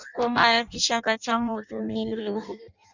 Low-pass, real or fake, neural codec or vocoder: 7.2 kHz; fake; codec, 16 kHz in and 24 kHz out, 0.6 kbps, FireRedTTS-2 codec